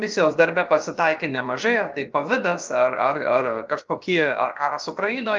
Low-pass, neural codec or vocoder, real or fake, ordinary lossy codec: 7.2 kHz; codec, 16 kHz, about 1 kbps, DyCAST, with the encoder's durations; fake; Opus, 32 kbps